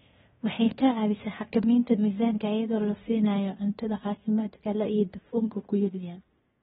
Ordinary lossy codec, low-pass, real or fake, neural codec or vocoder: AAC, 16 kbps; 10.8 kHz; fake; codec, 16 kHz in and 24 kHz out, 0.9 kbps, LongCat-Audio-Codec, fine tuned four codebook decoder